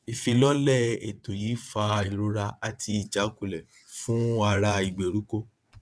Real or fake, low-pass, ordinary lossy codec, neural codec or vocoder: fake; none; none; vocoder, 22.05 kHz, 80 mel bands, WaveNeXt